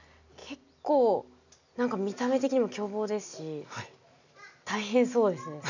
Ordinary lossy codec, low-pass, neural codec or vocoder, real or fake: none; 7.2 kHz; none; real